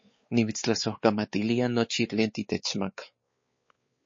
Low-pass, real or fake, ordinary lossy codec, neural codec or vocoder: 7.2 kHz; fake; MP3, 32 kbps; codec, 16 kHz, 4 kbps, X-Codec, WavLM features, trained on Multilingual LibriSpeech